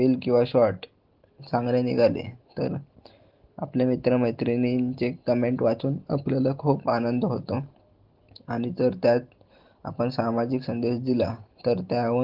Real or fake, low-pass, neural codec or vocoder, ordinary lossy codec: real; 5.4 kHz; none; Opus, 16 kbps